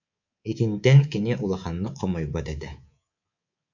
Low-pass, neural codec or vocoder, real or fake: 7.2 kHz; codec, 24 kHz, 3.1 kbps, DualCodec; fake